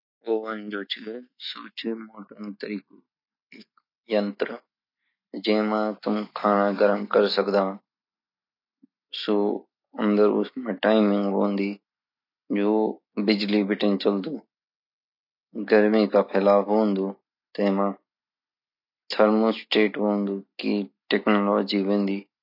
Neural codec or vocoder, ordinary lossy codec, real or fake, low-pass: none; none; real; 5.4 kHz